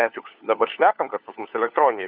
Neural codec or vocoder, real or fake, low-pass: codec, 16 kHz, 4 kbps, FunCodec, trained on LibriTTS, 50 frames a second; fake; 5.4 kHz